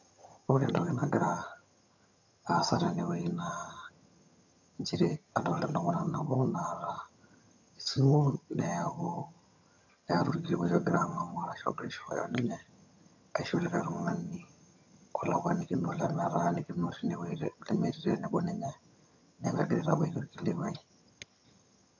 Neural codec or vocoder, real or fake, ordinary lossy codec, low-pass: vocoder, 22.05 kHz, 80 mel bands, HiFi-GAN; fake; none; 7.2 kHz